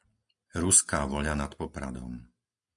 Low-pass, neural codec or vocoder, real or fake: 10.8 kHz; none; real